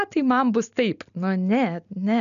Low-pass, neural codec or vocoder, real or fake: 7.2 kHz; none; real